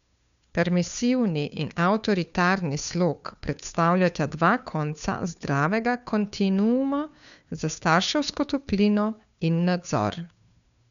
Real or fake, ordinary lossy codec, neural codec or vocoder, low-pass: fake; none; codec, 16 kHz, 2 kbps, FunCodec, trained on Chinese and English, 25 frames a second; 7.2 kHz